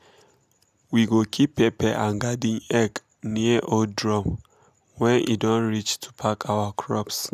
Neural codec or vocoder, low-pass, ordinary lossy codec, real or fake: none; 14.4 kHz; none; real